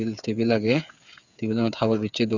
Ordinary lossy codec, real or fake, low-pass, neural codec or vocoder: none; fake; 7.2 kHz; codec, 16 kHz, 8 kbps, FreqCodec, smaller model